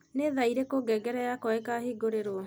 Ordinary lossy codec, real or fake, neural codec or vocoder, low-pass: none; real; none; none